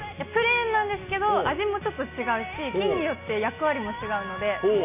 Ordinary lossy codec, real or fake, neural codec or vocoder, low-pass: none; real; none; 3.6 kHz